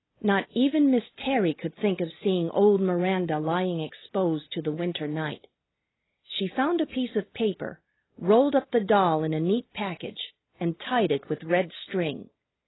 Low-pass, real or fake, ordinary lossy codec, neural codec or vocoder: 7.2 kHz; real; AAC, 16 kbps; none